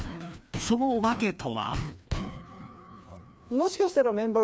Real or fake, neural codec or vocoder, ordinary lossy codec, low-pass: fake; codec, 16 kHz, 2 kbps, FreqCodec, larger model; none; none